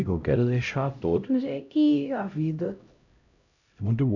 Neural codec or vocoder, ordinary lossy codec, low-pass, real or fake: codec, 16 kHz, 0.5 kbps, X-Codec, HuBERT features, trained on LibriSpeech; none; 7.2 kHz; fake